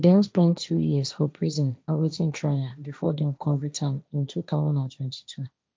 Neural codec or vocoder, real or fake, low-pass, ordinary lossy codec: codec, 16 kHz, 1.1 kbps, Voila-Tokenizer; fake; none; none